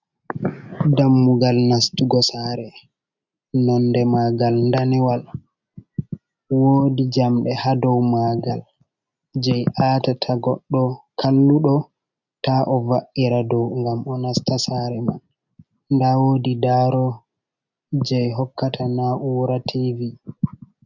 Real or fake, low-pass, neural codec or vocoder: real; 7.2 kHz; none